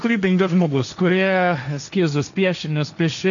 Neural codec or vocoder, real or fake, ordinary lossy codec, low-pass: codec, 16 kHz, 1.1 kbps, Voila-Tokenizer; fake; MP3, 96 kbps; 7.2 kHz